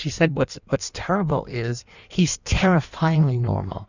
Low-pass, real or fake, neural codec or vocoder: 7.2 kHz; fake; codec, 16 kHz in and 24 kHz out, 1.1 kbps, FireRedTTS-2 codec